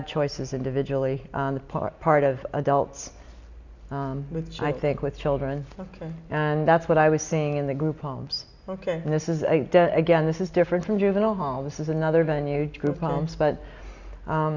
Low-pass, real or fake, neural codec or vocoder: 7.2 kHz; real; none